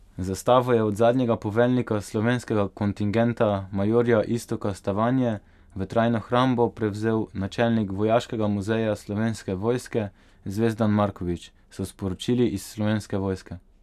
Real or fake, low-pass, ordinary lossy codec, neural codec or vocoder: real; 14.4 kHz; none; none